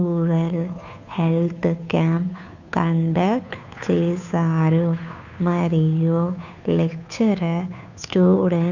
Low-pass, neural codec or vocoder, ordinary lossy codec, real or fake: 7.2 kHz; codec, 16 kHz, 2 kbps, FunCodec, trained on Chinese and English, 25 frames a second; none; fake